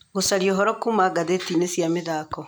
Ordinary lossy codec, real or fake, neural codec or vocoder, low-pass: none; real; none; none